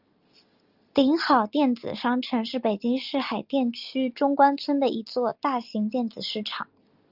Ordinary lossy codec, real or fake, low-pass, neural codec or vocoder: Opus, 24 kbps; real; 5.4 kHz; none